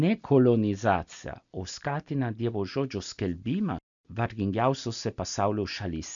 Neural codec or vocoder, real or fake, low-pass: none; real; 7.2 kHz